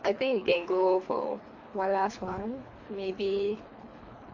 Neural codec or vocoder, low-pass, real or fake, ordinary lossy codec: codec, 24 kHz, 3 kbps, HILCodec; 7.2 kHz; fake; MP3, 48 kbps